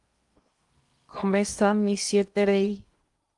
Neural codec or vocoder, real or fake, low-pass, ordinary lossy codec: codec, 16 kHz in and 24 kHz out, 0.6 kbps, FocalCodec, streaming, 2048 codes; fake; 10.8 kHz; Opus, 32 kbps